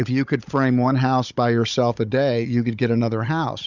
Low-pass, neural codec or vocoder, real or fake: 7.2 kHz; codec, 16 kHz, 16 kbps, FunCodec, trained on Chinese and English, 50 frames a second; fake